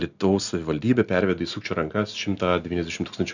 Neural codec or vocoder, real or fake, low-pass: none; real; 7.2 kHz